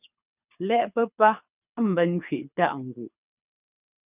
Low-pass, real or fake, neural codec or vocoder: 3.6 kHz; fake; codec, 24 kHz, 6 kbps, HILCodec